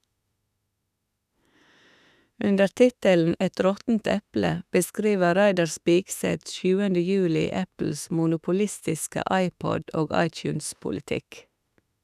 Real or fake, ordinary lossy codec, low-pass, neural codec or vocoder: fake; none; 14.4 kHz; autoencoder, 48 kHz, 32 numbers a frame, DAC-VAE, trained on Japanese speech